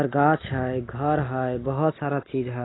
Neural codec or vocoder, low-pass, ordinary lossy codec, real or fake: none; 7.2 kHz; AAC, 16 kbps; real